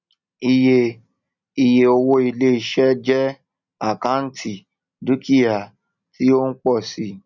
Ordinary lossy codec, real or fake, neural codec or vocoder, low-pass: none; real; none; 7.2 kHz